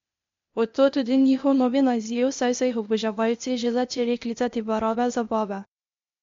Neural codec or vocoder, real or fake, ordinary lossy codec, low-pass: codec, 16 kHz, 0.8 kbps, ZipCodec; fake; MP3, 48 kbps; 7.2 kHz